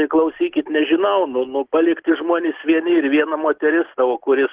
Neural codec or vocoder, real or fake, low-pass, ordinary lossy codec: none; real; 3.6 kHz; Opus, 16 kbps